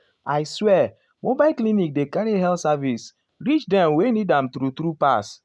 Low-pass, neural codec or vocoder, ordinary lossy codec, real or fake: none; none; none; real